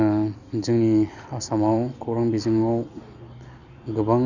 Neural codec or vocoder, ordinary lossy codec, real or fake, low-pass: none; none; real; 7.2 kHz